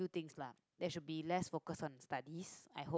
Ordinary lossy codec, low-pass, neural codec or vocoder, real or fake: none; none; none; real